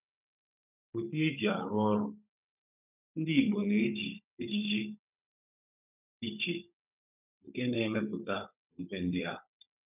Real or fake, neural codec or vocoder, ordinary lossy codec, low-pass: fake; codec, 16 kHz, 16 kbps, FunCodec, trained on Chinese and English, 50 frames a second; none; 3.6 kHz